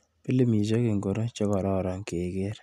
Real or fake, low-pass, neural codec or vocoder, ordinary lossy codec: real; 14.4 kHz; none; none